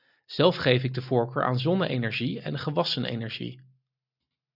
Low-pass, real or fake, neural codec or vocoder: 5.4 kHz; real; none